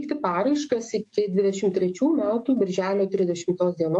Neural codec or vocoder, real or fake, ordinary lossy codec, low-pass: codec, 44.1 kHz, 7.8 kbps, DAC; fake; AAC, 64 kbps; 10.8 kHz